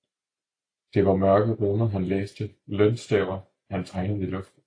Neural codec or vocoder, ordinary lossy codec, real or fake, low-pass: none; AAC, 48 kbps; real; 9.9 kHz